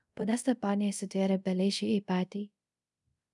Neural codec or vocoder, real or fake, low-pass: codec, 24 kHz, 0.5 kbps, DualCodec; fake; 10.8 kHz